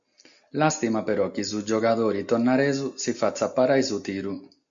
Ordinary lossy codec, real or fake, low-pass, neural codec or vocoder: AAC, 64 kbps; real; 7.2 kHz; none